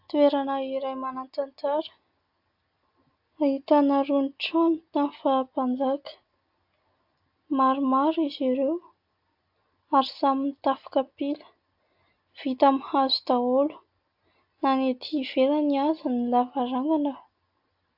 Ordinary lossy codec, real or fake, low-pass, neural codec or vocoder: AAC, 48 kbps; real; 5.4 kHz; none